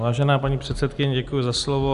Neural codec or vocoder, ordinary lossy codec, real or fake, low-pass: none; AAC, 96 kbps; real; 10.8 kHz